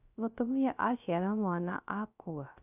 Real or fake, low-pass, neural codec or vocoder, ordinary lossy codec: fake; 3.6 kHz; codec, 16 kHz, 0.3 kbps, FocalCodec; none